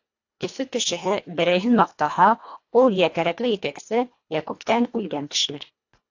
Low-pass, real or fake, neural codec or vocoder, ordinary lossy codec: 7.2 kHz; fake; codec, 24 kHz, 1.5 kbps, HILCodec; AAC, 48 kbps